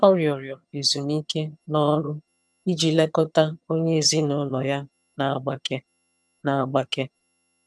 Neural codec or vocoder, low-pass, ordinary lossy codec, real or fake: vocoder, 22.05 kHz, 80 mel bands, HiFi-GAN; none; none; fake